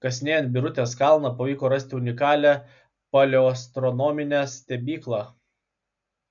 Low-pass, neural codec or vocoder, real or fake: 7.2 kHz; none; real